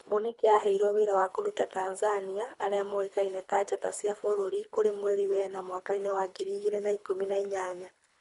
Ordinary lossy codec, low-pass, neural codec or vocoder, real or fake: none; 10.8 kHz; codec, 24 kHz, 3 kbps, HILCodec; fake